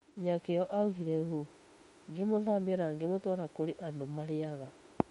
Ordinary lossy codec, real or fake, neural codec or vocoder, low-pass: MP3, 48 kbps; fake; autoencoder, 48 kHz, 32 numbers a frame, DAC-VAE, trained on Japanese speech; 19.8 kHz